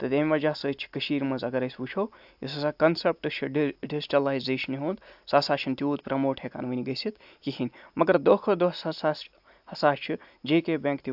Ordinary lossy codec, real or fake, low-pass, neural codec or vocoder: AAC, 48 kbps; real; 5.4 kHz; none